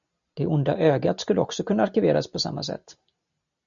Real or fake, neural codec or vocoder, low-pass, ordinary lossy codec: real; none; 7.2 kHz; MP3, 96 kbps